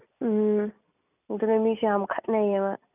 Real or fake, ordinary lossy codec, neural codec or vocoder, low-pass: real; none; none; 3.6 kHz